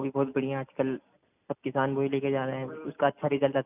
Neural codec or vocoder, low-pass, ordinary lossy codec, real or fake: none; 3.6 kHz; none; real